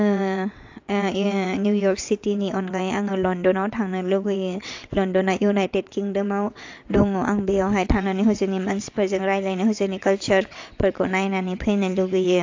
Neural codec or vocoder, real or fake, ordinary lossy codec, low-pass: vocoder, 22.05 kHz, 80 mel bands, WaveNeXt; fake; AAC, 48 kbps; 7.2 kHz